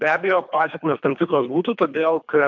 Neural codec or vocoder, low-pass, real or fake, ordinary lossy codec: codec, 24 kHz, 3 kbps, HILCodec; 7.2 kHz; fake; MP3, 64 kbps